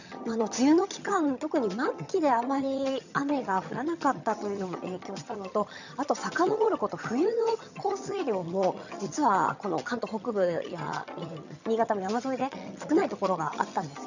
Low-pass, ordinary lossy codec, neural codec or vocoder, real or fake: 7.2 kHz; none; vocoder, 22.05 kHz, 80 mel bands, HiFi-GAN; fake